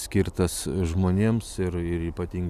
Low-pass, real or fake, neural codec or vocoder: 14.4 kHz; real; none